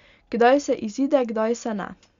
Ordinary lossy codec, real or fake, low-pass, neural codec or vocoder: Opus, 64 kbps; real; 7.2 kHz; none